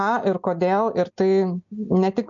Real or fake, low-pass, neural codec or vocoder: real; 7.2 kHz; none